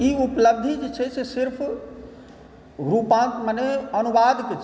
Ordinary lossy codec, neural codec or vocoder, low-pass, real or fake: none; none; none; real